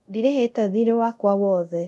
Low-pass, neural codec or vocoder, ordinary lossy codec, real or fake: none; codec, 24 kHz, 0.5 kbps, DualCodec; none; fake